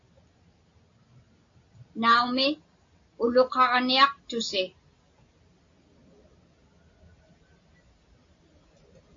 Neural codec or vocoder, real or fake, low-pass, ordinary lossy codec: none; real; 7.2 kHz; AAC, 48 kbps